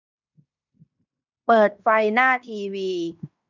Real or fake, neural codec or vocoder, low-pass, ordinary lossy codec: fake; codec, 16 kHz in and 24 kHz out, 0.9 kbps, LongCat-Audio-Codec, fine tuned four codebook decoder; 7.2 kHz; none